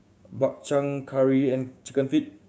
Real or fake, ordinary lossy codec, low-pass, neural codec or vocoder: fake; none; none; codec, 16 kHz, 6 kbps, DAC